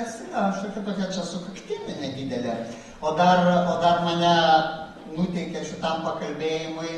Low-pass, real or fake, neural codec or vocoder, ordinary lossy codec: 19.8 kHz; real; none; AAC, 32 kbps